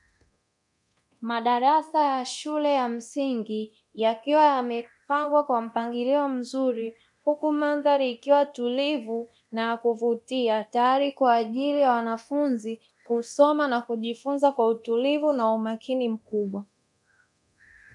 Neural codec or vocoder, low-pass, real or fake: codec, 24 kHz, 0.9 kbps, DualCodec; 10.8 kHz; fake